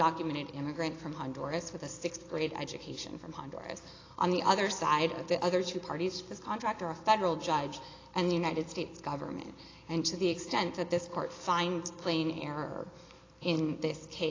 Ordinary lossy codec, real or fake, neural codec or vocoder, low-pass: AAC, 32 kbps; real; none; 7.2 kHz